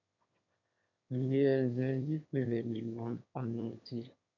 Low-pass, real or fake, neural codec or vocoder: 7.2 kHz; fake; autoencoder, 22.05 kHz, a latent of 192 numbers a frame, VITS, trained on one speaker